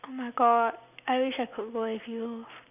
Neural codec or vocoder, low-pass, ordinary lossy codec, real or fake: none; 3.6 kHz; none; real